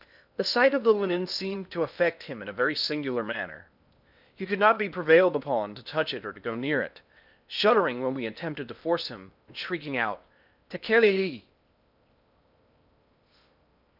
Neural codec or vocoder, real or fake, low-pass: codec, 16 kHz in and 24 kHz out, 0.8 kbps, FocalCodec, streaming, 65536 codes; fake; 5.4 kHz